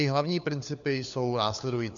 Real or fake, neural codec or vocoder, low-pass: fake; codec, 16 kHz, 4 kbps, FunCodec, trained on LibriTTS, 50 frames a second; 7.2 kHz